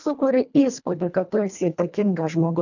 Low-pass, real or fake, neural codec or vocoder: 7.2 kHz; fake; codec, 24 kHz, 1.5 kbps, HILCodec